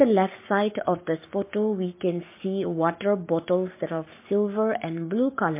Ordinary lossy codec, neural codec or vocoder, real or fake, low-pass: MP3, 24 kbps; codec, 44.1 kHz, 7.8 kbps, Pupu-Codec; fake; 3.6 kHz